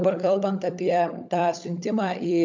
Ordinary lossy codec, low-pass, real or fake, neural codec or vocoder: MP3, 64 kbps; 7.2 kHz; fake; codec, 16 kHz, 16 kbps, FunCodec, trained on LibriTTS, 50 frames a second